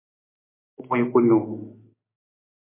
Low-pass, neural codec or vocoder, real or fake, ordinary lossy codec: 3.6 kHz; none; real; MP3, 24 kbps